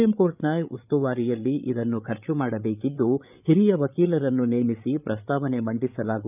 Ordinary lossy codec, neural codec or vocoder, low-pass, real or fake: none; codec, 16 kHz, 8 kbps, FreqCodec, larger model; 3.6 kHz; fake